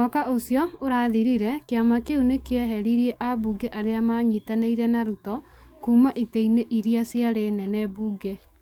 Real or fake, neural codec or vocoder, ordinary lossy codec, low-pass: fake; codec, 44.1 kHz, 7.8 kbps, DAC; none; 19.8 kHz